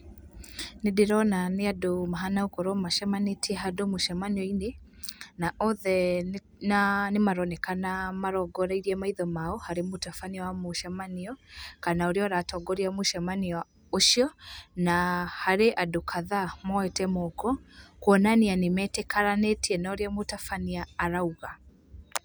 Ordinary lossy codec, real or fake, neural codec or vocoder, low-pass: none; real; none; none